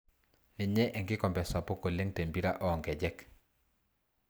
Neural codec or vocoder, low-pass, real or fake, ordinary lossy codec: none; none; real; none